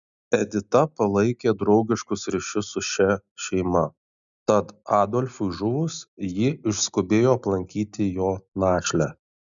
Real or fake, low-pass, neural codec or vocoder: real; 7.2 kHz; none